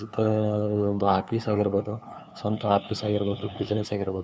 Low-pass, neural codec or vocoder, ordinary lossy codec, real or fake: none; codec, 16 kHz, 2 kbps, FunCodec, trained on LibriTTS, 25 frames a second; none; fake